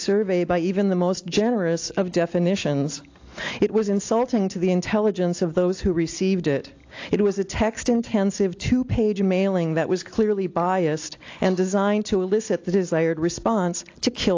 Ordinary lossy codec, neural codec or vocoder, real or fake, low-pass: AAC, 48 kbps; none; real; 7.2 kHz